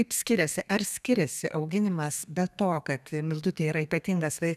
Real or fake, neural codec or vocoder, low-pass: fake; codec, 32 kHz, 1.9 kbps, SNAC; 14.4 kHz